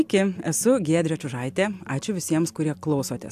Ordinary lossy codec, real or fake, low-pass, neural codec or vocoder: AAC, 96 kbps; real; 14.4 kHz; none